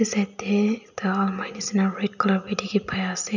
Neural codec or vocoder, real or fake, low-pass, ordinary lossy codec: none; real; 7.2 kHz; none